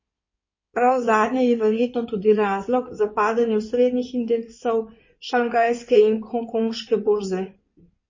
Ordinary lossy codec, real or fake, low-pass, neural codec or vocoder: MP3, 32 kbps; fake; 7.2 kHz; codec, 16 kHz in and 24 kHz out, 2.2 kbps, FireRedTTS-2 codec